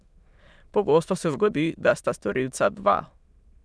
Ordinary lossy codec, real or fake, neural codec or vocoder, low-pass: none; fake; autoencoder, 22.05 kHz, a latent of 192 numbers a frame, VITS, trained on many speakers; none